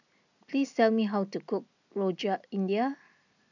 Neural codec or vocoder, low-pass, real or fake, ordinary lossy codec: none; 7.2 kHz; real; none